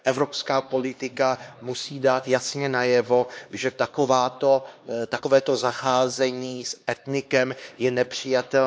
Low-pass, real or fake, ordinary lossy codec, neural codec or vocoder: none; fake; none; codec, 16 kHz, 2 kbps, X-Codec, HuBERT features, trained on LibriSpeech